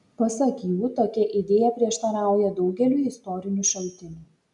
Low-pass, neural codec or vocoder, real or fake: 10.8 kHz; none; real